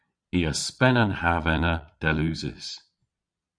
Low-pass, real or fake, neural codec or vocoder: 9.9 kHz; fake; vocoder, 22.05 kHz, 80 mel bands, Vocos